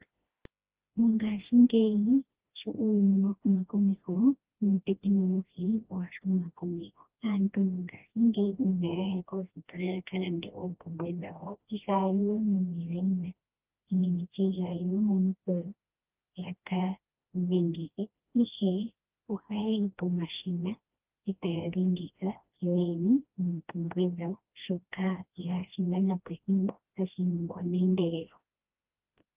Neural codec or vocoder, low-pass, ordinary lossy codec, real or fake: codec, 16 kHz, 1 kbps, FreqCodec, smaller model; 3.6 kHz; Opus, 24 kbps; fake